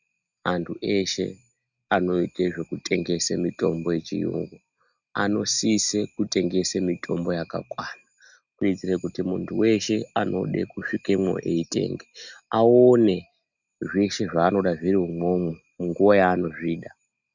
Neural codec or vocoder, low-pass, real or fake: none; 7.2 kHz; real